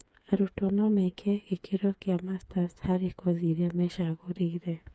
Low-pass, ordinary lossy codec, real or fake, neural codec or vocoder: none; none; fake; codec, 16 kHz, 4 kbps, FreqCodec, smaller model